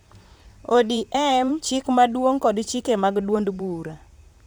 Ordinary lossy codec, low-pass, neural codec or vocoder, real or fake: none; none; vocoder, 44.1 kHz, 128 mel bands, Pupu-Vocoder; fake